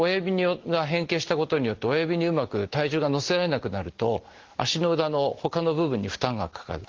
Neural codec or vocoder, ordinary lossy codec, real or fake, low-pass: none; Opus, 16 kbps; real; 7.2 kHz